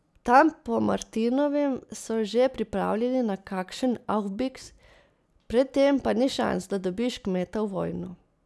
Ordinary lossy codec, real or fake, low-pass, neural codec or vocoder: none; real; none; none